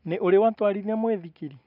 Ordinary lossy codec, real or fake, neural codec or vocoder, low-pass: none; real; none; 5.4 kHz